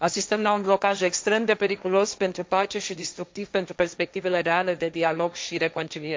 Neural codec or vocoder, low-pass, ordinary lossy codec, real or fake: codec, 16 kHz, 1.1 kbps, Voila-Tokenizer; none; none; fake